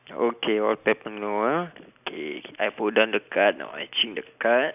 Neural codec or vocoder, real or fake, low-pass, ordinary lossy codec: none; real; 3.6 kHz; none